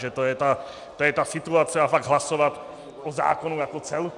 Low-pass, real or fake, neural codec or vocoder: 10.8 kHz; real; none